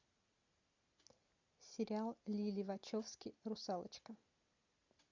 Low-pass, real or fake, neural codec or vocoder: 7.2 kHz; real; none